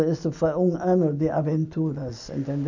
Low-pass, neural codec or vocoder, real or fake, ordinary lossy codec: 7.2 kHz; none; real; none